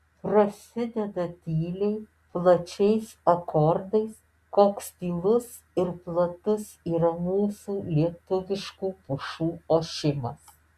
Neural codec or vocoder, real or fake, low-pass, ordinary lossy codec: none; real; 14.4 kHz; Opus, 64 kbps